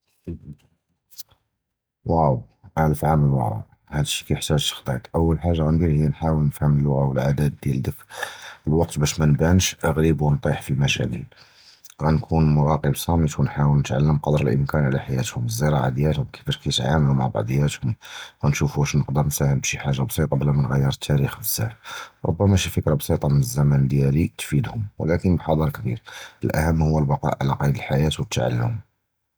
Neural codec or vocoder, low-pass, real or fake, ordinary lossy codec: none; none; real; none